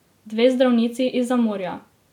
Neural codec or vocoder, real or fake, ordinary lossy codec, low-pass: none; real; none; 19.8 kHz